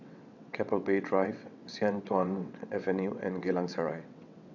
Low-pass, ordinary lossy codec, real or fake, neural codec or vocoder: 7.2 kHz; none; fake; vocoder, 22.05 kHz, 80 mel bands, WaveNeXt